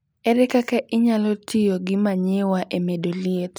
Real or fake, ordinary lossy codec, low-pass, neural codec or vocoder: real; none; none; none